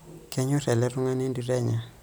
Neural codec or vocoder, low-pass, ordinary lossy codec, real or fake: none; none; none; real